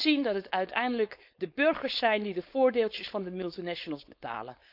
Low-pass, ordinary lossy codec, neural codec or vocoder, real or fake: 5.4 kHz; none; codec, 16 kHz, 4.8 kbps, FACodec; fake